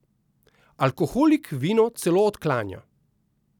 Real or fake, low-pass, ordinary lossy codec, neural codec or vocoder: real; 19.8 kHz; none; none